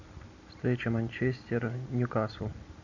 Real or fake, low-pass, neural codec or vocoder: real; 7.2 kHz; none